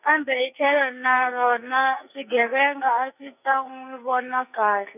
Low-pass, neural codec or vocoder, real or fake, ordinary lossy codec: 3.6 kHz; codec, 44.1 kHz, 2.6 kbps, SNAC; fake; none